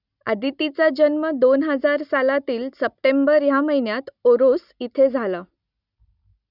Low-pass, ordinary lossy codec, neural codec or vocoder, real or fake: 5.4 kHz; none; none; real